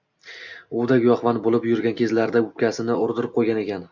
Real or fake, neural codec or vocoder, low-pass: real; none; 7.2 kHz